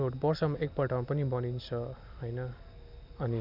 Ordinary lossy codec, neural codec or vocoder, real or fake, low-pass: none; none; real; 5.4 kHz